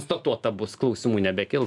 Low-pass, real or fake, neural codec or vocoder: 10.8 kHz; real; none